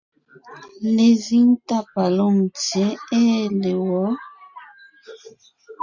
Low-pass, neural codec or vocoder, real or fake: 7.2 kHz; none; real